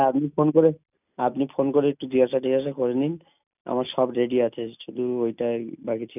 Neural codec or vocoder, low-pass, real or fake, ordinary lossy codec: none; 3.6 kHz; real; none